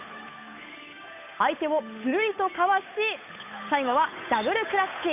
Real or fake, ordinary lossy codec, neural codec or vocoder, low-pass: fake; none; codec, 16 kHz, 8 kbps, FunCodec, trained on Chinese and English, 25 frames a second; 3.6 kHz